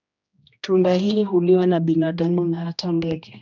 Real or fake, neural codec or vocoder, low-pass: fake; codec, 16 kHz, 1 kbps, X-Codec, HuBERT features, trained on general audio; 7.2 kHz